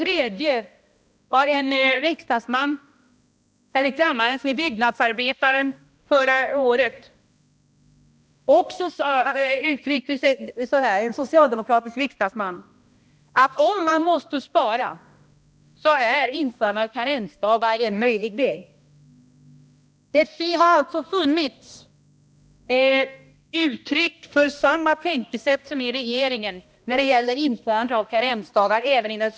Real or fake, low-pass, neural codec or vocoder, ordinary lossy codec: fake; none; codec, 16 kHz, 1 kbps, X-Codec, HuBERT features, trained on balanced general audio; none